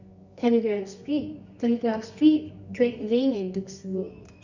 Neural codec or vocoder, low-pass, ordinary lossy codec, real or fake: codec, 24 kHz, 0.9 kbps, WavTokenizer, medium music audio release; 7.2 kHz; none; fake